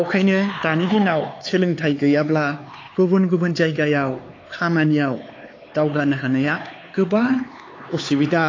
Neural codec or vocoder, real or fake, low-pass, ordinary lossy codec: codec, 16 kHz, 4 kbps, X-Codec, HuBERT features, trained on LibriSpeech; fake; 7.2 kHz; AAC, 32 kbps